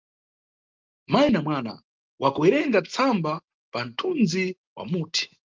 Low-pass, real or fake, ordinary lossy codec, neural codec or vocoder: 7.2 kHz; real; Opus, 24 kbps; none